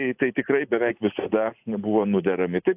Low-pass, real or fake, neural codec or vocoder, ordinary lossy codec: 3.6 kHz; real; none; AAC, 32 kbps